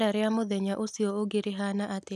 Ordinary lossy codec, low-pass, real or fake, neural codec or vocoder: none; 14.4 kHz; real; none